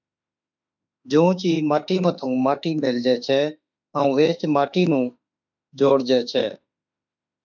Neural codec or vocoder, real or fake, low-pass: autoencoder, 48 kHz, 32 numbers a frame, DAC-VAE, trained on Japanese speech; fake; 7.2 kHz